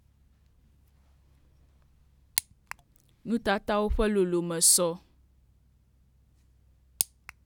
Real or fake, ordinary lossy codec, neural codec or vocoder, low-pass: real; none; none; 19.8 kHz